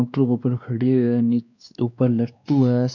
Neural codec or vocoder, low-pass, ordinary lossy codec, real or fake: codec, 16 kHz, 2 kbps, X-Codec, WavLM features, trained on Multilingual LibriSpeech; 7.2 kHz; none; fake